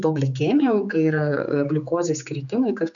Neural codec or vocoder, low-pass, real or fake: codec, 16 kHz, 4 kbps, X-Codec, HuBERT features, trained on general audio; 7.2 kHz; fake